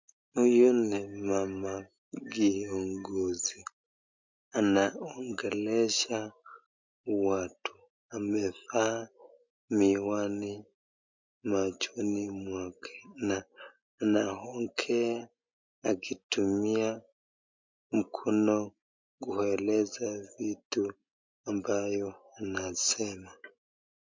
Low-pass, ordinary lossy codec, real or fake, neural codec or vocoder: 7.2 kHz; MP3, 64 kbps; real; none